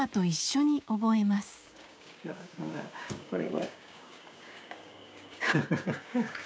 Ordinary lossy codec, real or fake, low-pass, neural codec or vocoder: none; fake; none; codec, 16 kHz, 6 kbps, DAC